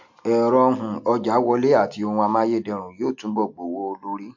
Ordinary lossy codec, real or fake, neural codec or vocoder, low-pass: MP3, 48 kbps; real; none; 7.2 kHz